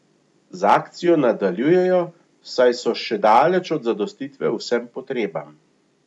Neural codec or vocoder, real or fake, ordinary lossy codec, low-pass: none; real; none; 10.8 kHz